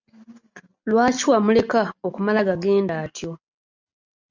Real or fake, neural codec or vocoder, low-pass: real; none; 7.2 kHz